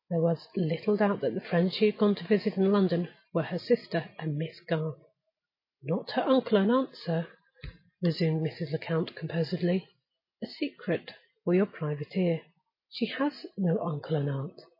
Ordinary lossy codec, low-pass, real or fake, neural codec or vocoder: MP3, 24 kbps; 5.4 kHz; real; none